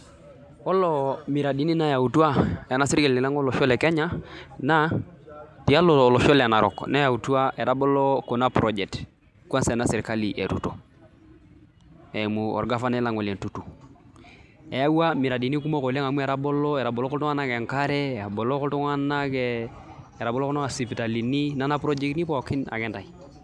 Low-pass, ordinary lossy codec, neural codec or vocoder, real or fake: none; none; none; real